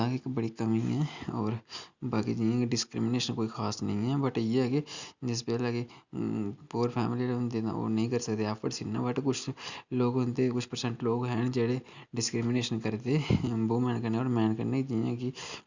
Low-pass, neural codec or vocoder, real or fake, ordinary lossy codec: 7.2 kHz; none; real; Opus, 64 kbps